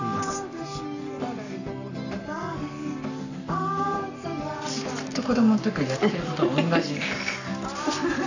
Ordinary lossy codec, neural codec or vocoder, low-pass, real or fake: none; codec, 44.1 kHz, 7.8 kbps, Pupu-Codec; 7.2 kHz; fake